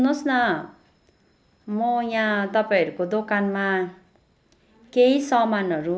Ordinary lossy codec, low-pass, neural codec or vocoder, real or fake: none; none; none; real